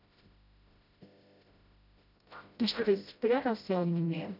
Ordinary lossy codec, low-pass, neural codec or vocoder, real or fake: none; 5.4 kHz; codec, 16 kHz, 0.5 kbps, FreqCodec, smaller model; fake